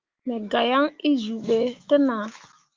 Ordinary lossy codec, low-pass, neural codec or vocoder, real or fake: Opus, 32 kbps; 7.2 kHz; autoencoder, 48 kHz, 128 numbers a frame, DAC-VAE, trained on Japanese speech; fake